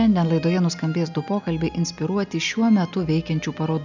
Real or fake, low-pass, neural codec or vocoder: real; 7.2 kHz; none